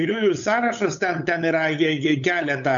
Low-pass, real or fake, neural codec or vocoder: 7.2 kHz; fake; codec, 16 kHz, 8 kbps, FunCodec, trained on LibriTTS, 25 frames a second